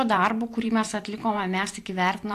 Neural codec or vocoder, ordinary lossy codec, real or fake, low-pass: vocoder, 44.1 kHz, 128 mel bands every 512 samples, BigVGAN v2; AAC, 64 kbps; fake; 14.4 kHz